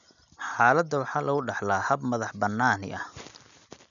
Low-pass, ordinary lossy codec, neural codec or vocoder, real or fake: 7.2 kHz; none; none; real